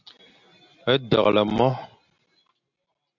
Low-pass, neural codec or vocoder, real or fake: 7.2 kHz; none; real